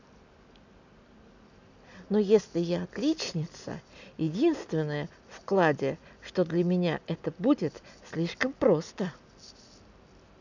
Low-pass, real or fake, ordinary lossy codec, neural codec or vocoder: 7.2 kHz; real; none; none